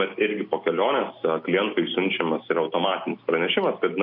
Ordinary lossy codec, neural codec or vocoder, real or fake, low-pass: MP3, 48 kbps; none; real; 5.4 kHz